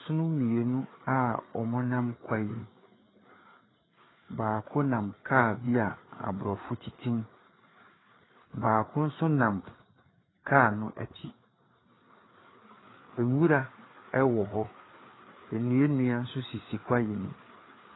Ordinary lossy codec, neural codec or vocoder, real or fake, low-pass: AAC, 16 kbps; codec, 16 kHz, 4 kbps, FreqCodec, larger model; fake; 7.2 kHz